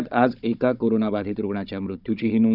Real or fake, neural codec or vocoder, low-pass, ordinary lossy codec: fake; codec, 16 kHz, 16 kbps, FunCodec, trained on Chinese and English, 50 frames a second; 5.4 kHz; none